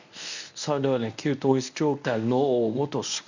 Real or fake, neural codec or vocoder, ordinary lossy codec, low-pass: fake; codec, 24 kHz, 0.9 kbps, WavTokenizer, medium speech release version 1; none; 7.2 kHz